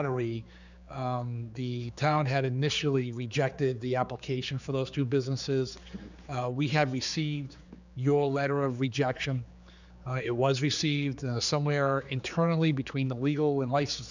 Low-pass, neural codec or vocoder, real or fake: 7.2 kHz; codec, 16 kHz, 4 kbps, X-Codec, HuBERT features, trained on general audio; fake